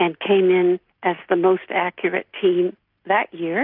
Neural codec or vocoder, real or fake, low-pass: none; real; 5.4 kHz